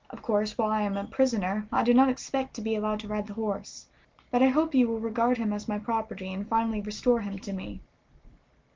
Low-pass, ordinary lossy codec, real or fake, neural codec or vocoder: 7.2 kHz; Opus, 32 kbps; real; none